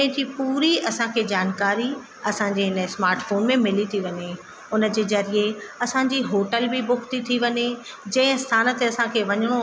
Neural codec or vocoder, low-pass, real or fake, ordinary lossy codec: none; none; real; none